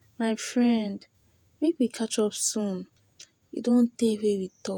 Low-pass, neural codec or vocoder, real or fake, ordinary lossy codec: 19.8 kHz; vocoder, 48 kHz, 128 mel bands, Vocos; fake; none